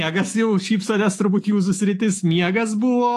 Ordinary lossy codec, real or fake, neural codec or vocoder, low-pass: AAC, 48 kbps; fake; autoencoder, 48 kHz, 128 numbers a frame, DAC-VAE, trained on Japanese speech; 14.4 kHz